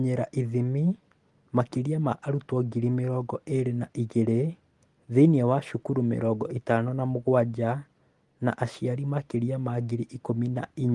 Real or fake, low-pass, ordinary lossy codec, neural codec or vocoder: real; 10.8 kHz; Opus, 24 kbps; none